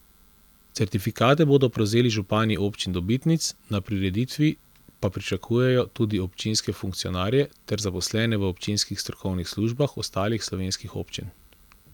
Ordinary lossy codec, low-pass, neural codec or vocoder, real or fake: none; 19.8 kHz; none; real